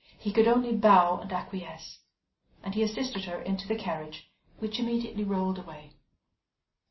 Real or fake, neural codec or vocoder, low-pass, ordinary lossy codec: real; none; 7.2 kHz; MP3, 24 kbps